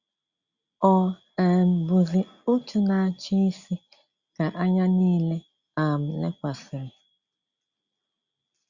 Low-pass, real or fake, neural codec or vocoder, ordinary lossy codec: 7.2 kHz; real; none; Opus, 64 kbps